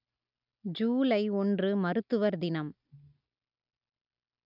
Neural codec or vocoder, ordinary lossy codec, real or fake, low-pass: none; none; real; 5.4 kHz